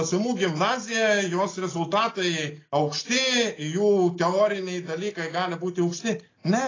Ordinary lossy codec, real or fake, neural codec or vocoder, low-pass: AAC, 32 kbps; real; none; 7.2 kHz